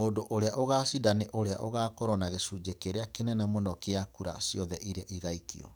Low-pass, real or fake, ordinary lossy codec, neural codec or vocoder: none; fake; none; codec, 44.1 kHz, 7.8 kbps, DAC